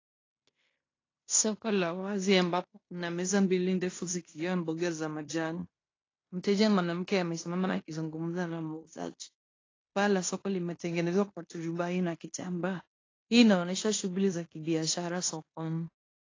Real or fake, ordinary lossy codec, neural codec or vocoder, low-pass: fake; AAC, 32 kbps; codec, 16 kHz in and 24 kHz out, 0.9 kbps, LongCat-Audio-Codec, fine tuned four codebook decoder; 7.2 kHz